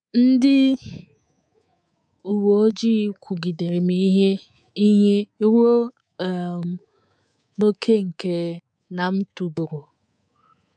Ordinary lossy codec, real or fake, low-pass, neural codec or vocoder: none; fake; 9.9 kHz; codec, 24 kHz, 3.1 kbps, DualCodec